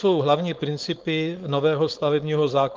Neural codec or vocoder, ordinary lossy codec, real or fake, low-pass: codec, 16 kHz, 4.8 kbps, FACodec; Opus, 32 kbps; fake; 7.2 kHz